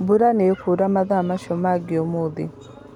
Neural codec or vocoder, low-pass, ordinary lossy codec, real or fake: none; 19.8 kHz; none; real